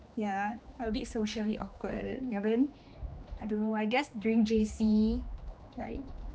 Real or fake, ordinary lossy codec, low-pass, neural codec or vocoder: fake; none; none; codec, 16 kHz, 2 kbps, X-Codec, HuBERT features, trained on general audio